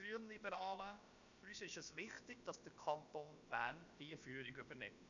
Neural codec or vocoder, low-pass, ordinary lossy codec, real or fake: codec, 16 kHz, about 1 kbps, DyCAST, with the encoder's durations; 7.2 kHz; none; fake